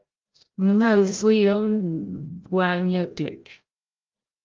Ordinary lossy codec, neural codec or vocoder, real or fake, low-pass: Opus, 32 kbps; codec, 16 kHz, 0.5 kbps, FreqCodec, larger model; fake; 7.2 kHz